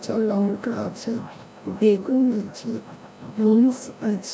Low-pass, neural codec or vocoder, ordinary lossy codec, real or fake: none; codec, 16 kHz, 0.5 kbps, FreqCodec, larger model; none; fake